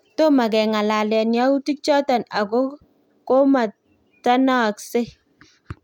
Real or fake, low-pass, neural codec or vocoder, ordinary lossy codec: real; 19.8 kHz; none; none